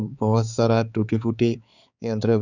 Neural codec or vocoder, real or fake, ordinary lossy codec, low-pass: codec, 16 kHz, 4 kbps, X-Codec, HuBERT features, trained on balanced general audio; fake; none; 7.2 kHz